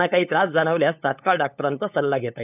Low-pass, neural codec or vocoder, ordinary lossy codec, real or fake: 3.6 kHz; codec, 16 kHz, 8 kbps, FunCodec, trained on LibriTTS, 25 frames a second; none; fake